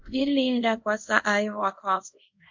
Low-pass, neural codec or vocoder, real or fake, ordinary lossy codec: 7.2 kHz; codec, 24 kHz, 0.5 kbps, DualCodec; fake; MP3, 64 kbps